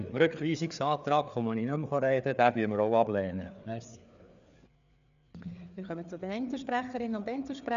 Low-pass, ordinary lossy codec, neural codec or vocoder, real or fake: 7.2 kHz; none; codec, 16 kHz, 4 kbps, FreqCodec, larger model; fake